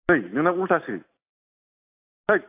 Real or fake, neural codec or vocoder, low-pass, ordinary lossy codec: real; none; 3.6 kHz; AAC, 24 kbps